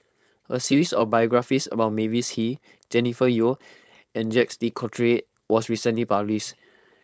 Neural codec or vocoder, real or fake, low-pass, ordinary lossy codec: codec, 16 kHz, 4.8 kbps, FACodec; fake; none; none